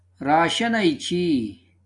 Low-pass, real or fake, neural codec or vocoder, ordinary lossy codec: 10.8 kHz; real; none; AAC, 64 kbps